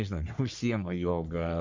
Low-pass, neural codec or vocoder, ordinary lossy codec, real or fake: 7.2 kHz; codec, 44.1 kHz, 3.4 kbps, Pupu-Codec; MP3, 64 kbps; fake